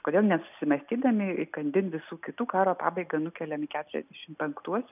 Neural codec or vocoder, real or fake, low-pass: none; real; 3.6 kHz